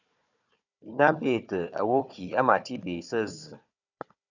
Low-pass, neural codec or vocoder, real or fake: 7.2 kHz; codec, 16 kHz, 4 kbps, FunCodec, trained on Chinese and English, 50 frames a second; fake